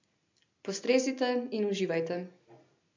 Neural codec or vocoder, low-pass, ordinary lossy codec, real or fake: none; 7.2 kHz; MP3, 64 kbps; real